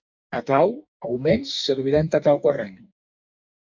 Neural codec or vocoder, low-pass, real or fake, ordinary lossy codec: codec, 44.1 kHz, 2.6 kbps, DAC; 7.2 kHz; fake; MP3, 64 kbps